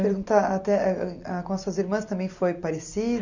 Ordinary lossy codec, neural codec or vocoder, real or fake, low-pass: none; none; real; 7.2 kHz